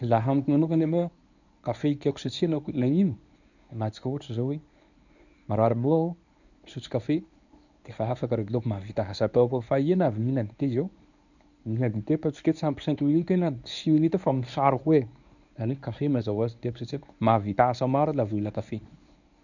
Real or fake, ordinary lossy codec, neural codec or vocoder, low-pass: fake; none; codec, 24 kHz, 0.9 kbps, WavTokenizer, medium speech release version 1; 7.2 kHz